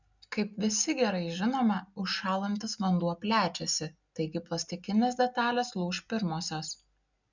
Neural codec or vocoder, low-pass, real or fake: none; 7.2 kHz; real